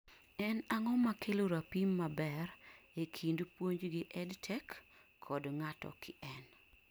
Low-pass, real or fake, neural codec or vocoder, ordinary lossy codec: none; real; none; none